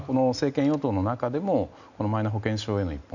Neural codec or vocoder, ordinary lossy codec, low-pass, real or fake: none; none; 7.2 kHz; real